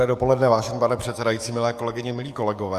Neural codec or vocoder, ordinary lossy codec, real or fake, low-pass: codec, 44.1 kHz, 7.8 kbps, DAC; Opus, 64 kbps; fake; 14.4 kHz